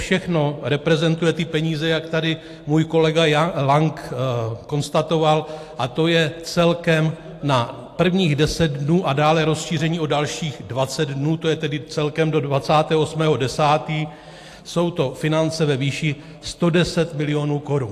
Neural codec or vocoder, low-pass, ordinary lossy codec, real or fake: none; 14.4 kHz; AAC, 64 kbps; real